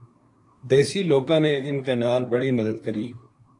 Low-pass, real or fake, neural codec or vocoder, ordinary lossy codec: 10.8 kHz; fake; codec, 24 kHz, 1 kbps, SNAC; AAC, 48 kbps